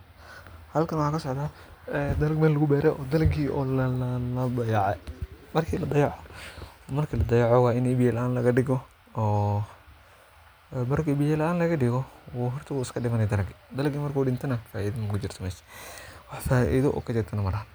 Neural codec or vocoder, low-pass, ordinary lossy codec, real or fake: none; none; none; real